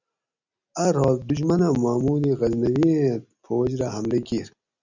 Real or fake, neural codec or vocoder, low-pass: real; none; 7.2 kHz